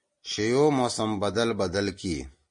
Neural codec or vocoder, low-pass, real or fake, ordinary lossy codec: none; 9.9 kHz; real; MP3, 48 kbps